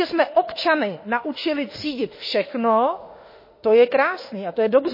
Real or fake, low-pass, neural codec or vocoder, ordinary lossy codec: fake; 5.4 kHz; autoencoder, 48 kHz, 32 numbers a frame, DAC-VAE, trained on Japanese speech; MP3, 24 kbps